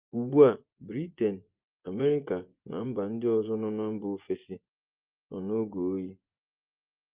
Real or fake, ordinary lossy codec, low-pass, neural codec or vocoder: fake; Opus, 32 kbps; 3.6 kHz; vocoder, 24 kHz, 100 mel bands, Vocos